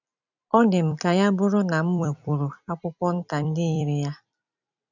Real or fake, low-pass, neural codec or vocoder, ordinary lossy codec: fake; 7.2 kHz; vocoder, 44.1 kHz, 128 mel bands every 256 samples, BigVGAN v2; none